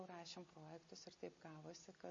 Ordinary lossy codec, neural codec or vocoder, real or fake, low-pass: MP3, 32 kbps; none; real; 7.2 kHz